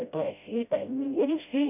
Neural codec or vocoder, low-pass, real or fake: codec, 16 kHz, 0.5 kbps, FreqCodec, smaller model; 3.6 kHz; fake